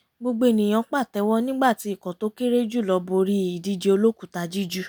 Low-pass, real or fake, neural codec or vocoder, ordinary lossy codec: none; real; none; none